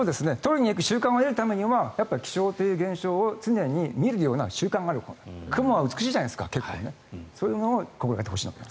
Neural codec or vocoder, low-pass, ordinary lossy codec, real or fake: none; none; none; real